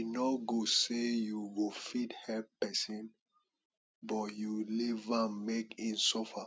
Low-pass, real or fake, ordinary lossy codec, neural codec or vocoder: none; real; none; none